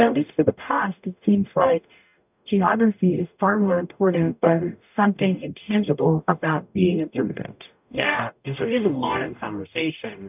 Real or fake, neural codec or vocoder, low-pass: fake; codec, 44.1 kHz, 0.9 kbps, DAC; 3.6 kHz